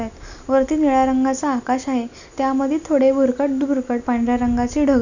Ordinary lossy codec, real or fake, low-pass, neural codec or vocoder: none; real; 7.2 kHz; none